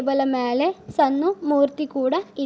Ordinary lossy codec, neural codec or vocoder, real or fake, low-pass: none; none; real; none